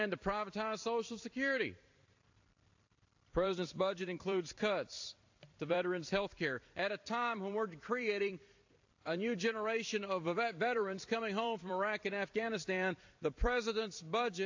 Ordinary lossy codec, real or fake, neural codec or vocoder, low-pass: AAC, 48 kbps; fake; vocoder, 44.1 kHz, 128 mel bands every 256 samples, BigVGAN v2; 7.2 kHz